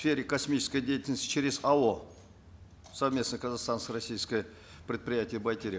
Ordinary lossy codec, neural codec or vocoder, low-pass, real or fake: none; none; none; real